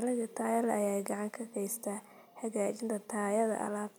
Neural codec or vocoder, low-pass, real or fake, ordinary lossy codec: none; none; real; none